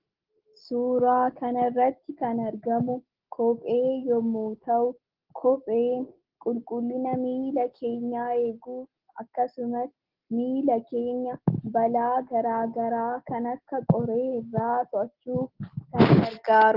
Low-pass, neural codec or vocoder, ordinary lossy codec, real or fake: 5.4 kHz; none; Opus, 16 kbps; real